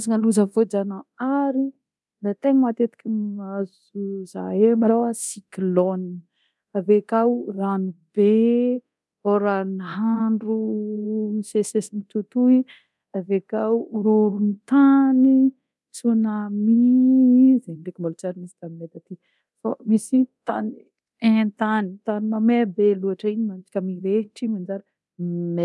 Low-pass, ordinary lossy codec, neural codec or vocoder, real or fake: none; none; codec, 24 kHz, 0.9 kbps, DualCodec; fake